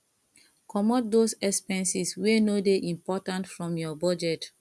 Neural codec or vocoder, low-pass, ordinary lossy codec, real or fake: none; none; none; real